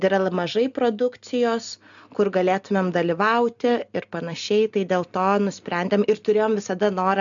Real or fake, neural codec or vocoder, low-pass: real; none; 7.2 kHz